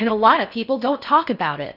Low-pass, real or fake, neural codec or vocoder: 5.4 kHz; fake; codec, 16 kHz in and 24 kHz out, 0.6 kbps, FocalCodec, streaming, 4096 codes